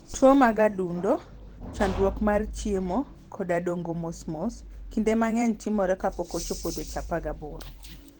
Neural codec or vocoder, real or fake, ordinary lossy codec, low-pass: vocoder, 44.1 kHz, 128 mel bands every 512 samples, BigVGAN v2; fake; Opus, 16 kbps; 19.8 kHz